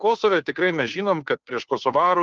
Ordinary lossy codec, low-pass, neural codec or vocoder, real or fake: Opus, 24 kbps; 7.2 kHz; codec, 16 kHz, 2 kbps, FunCodec, trained on Chinese and English, 25 frames a second; fake